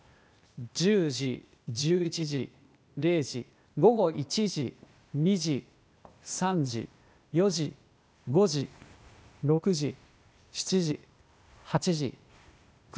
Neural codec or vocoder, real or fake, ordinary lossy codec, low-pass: codec, 16 kHz, 0.8 kbps, ZipCodec; fake; none; none